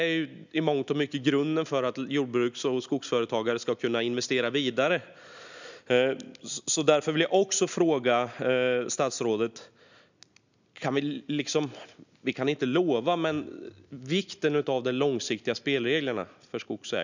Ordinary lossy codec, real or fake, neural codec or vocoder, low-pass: none; real; none; 7.2 kHz